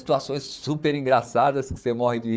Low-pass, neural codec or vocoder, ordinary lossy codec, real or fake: none; codec, 16 kHz, 4 kbps, FunCodec, trained on Chinese and English, 50 frames a second; none; fake